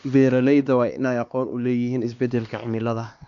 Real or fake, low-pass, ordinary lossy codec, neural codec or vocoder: fake; 7.2 kHz; none; codec, 16 kHz, 2 kbps, X-Codec, HuBERT features, trained on LibriSpeech